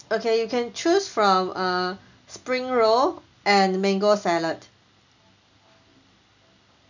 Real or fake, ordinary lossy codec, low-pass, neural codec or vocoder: real; none; 7.2 kHz; none